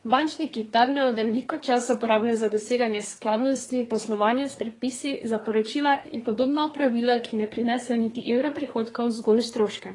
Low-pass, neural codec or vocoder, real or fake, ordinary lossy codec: 10.8 kHz; codec, 24 kHz, 1 kbps, SNAC; fake; AAC, 32 kbps